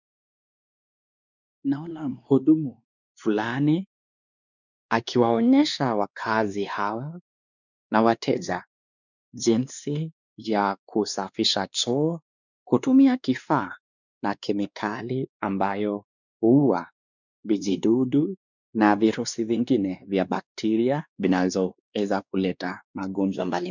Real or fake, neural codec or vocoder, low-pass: fake; codec, 16 kHz, 2 kbps, X-Codec, WavLM features, trained on Multilingual LibriSpeech; 7.2 kHz